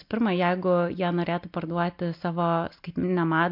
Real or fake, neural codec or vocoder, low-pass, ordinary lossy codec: real; none; 5.4 kHz; MP3, 48 kbps